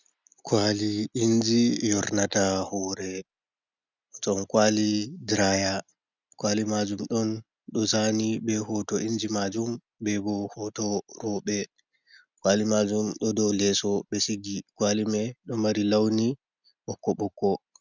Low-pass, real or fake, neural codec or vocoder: 7.2 kHz; real; none